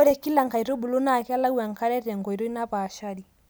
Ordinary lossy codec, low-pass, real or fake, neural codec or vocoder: none; none; real; none